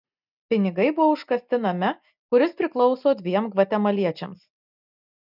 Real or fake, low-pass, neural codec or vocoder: real; 5.4 kHz; none